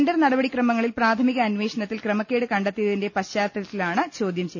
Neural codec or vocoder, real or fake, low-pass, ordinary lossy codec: none; real; none; none